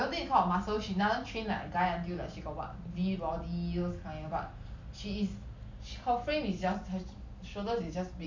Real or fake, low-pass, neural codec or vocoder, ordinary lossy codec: real; 7.2 kHz; none; MP3, 64 kbps